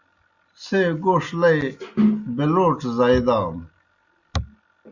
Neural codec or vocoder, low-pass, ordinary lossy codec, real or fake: none; 7.2 kHz; Opus, 64 kbps; real